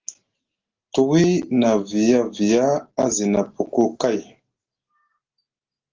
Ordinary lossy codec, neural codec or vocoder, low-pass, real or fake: Opus, 16 kbps; none; 7.2 kHz; real